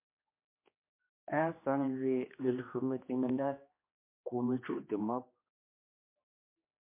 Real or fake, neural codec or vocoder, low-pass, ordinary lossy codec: fake; codec, 16 kHz, 2 kbps, X-Codec, HuBERT features, trained on general audio; 3.6 kHz; MP3, 24 kbps